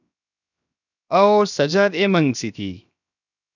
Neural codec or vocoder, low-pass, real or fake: codec, 16 kHz, 0.7 kbps, FocalCodec; 7.2 kHz; fake